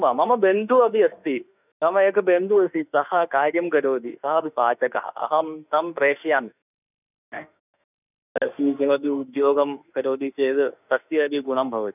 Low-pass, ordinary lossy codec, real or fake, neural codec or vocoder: 3.6 kHz; none; fake; autoencoder, 48 kHz, 32 numbers a frame, DAC-VAE, trained on Japanese speech